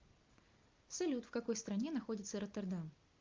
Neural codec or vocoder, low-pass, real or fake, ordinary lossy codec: none; 7.2 kHz; real; Opus, 32 kbps